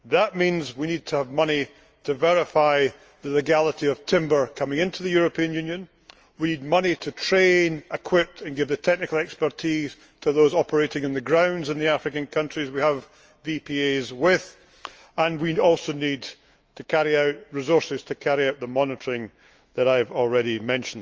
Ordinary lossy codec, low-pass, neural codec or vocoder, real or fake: Opus, 24 kbps; 7.2 kHz; autoencoder, 48 kHz, 128 numbers a frame, DAC-VAE, trained on Japanese speech; fake